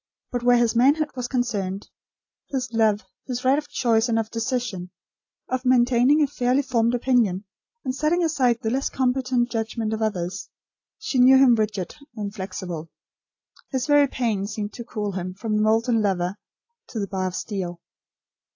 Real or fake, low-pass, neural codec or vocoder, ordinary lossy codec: real; 7.2 kHz; none; AAC, 48 kbps